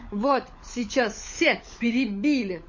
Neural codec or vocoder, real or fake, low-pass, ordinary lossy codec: codec, 16 kHz, 16 kbps, FunCodec, trained on LibriTTS, 50 frames a second; fake; 7.2 kHz; MP3, 32 kbps